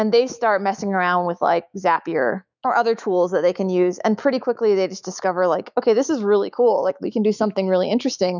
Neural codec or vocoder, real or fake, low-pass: autoencoder, 48 kHz, 128 numbers a frame, DAC-VAE, trained on Japanese speech; fake; 7.2 kHz